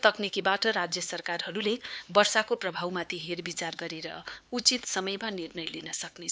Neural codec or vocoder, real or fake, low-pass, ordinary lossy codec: codec, 16 kHz, 4 kbps, X-Codec, HuBERT features, trained on LibriSpeech; fake; none; none